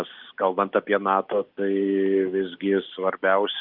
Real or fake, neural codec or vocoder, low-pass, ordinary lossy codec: real; none; 5.4 kHz; Opus, 32 kbps